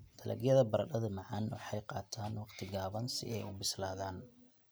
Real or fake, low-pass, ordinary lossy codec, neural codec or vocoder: real; none; none; none